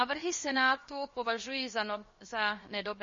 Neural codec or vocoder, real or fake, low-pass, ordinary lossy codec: codec, 16 kHz, 0.7 kbps, FocalCodec; fake; 7.2 kHz; MP3, 32 kbps